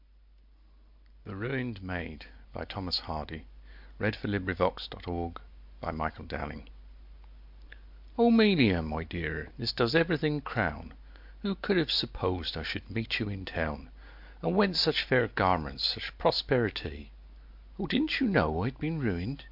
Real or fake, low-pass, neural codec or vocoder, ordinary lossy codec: real; 5.4 kHz; none; MP3, 48 kbps